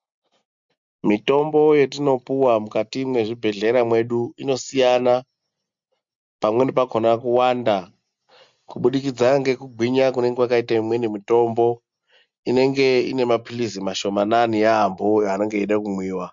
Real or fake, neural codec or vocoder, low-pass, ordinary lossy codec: real; none; 7.2 kHz; MP3, 64 kbps